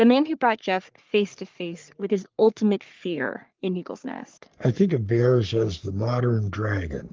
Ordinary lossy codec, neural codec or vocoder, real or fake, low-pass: Opus, 32 kbps; codec, 44.1 kHz, 3.4 kbps, Pupu-Codec; fake; 7.2 kHz